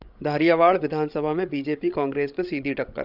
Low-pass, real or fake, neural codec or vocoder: 5.4 kHz; fake; codec, 16 kHz, 16 kbps, FreqCodec, larger model